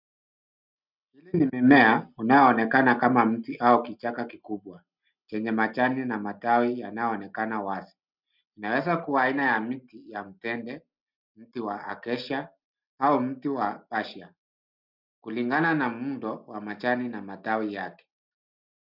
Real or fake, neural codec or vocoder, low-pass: real; none; 5.4 kHz